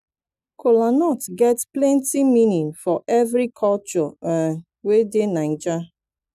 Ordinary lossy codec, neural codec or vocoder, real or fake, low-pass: none; none; real; 14.4 kHz